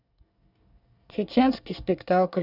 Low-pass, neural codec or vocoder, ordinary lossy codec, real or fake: 5.4 kHz; codec, 24 kHz, 1 kbps, SNAC; none; fake